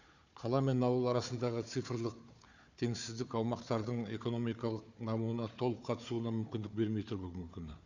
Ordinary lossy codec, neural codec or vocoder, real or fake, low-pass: none; codec, 16 kHz, 4 kbps, FunCodec, trained on Chinese and English, 50 frames a second; fake; 7.2 kHz